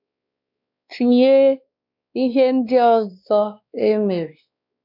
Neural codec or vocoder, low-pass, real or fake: codec, 16 kHz, 2 kbps, X-Codec, WavLM features, trained on Multilingual LibriSpeech; 5.4 kHz; fake